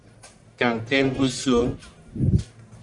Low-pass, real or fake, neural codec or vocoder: 10.8 kHz; fake; codec, 44.1 kHz, 1.7 kbps, Pupu-Codec